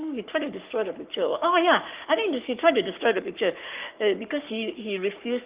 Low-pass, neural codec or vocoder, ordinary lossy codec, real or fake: 3.6 kHz; codec, 44.1 kHz, 7.8 kbps, Pupu-Codec; Opus, 32 kbps; fake